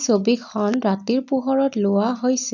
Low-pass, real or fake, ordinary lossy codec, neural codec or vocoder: 7.2 kHz; real; none; none